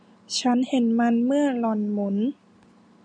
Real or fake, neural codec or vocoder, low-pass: real; none; 9.9 kHz